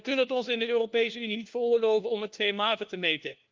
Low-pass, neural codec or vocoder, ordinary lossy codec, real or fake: 7.2 kHz; codec, 16 kHz, 1 kbps, FunCodec, trained on LibriTTS, 50 frames a second; Opus, 24 kbps; fake